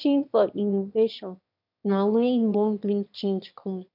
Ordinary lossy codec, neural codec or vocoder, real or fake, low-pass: none; autoencoder, 22.05 kHz, a latent of 192 numbers a frame, VITS, trained on one speaker; fake; 5.4 kHz